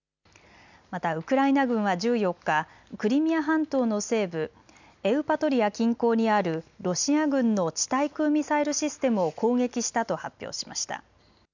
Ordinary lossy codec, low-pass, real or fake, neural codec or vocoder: none; 7.2 kHz; real; none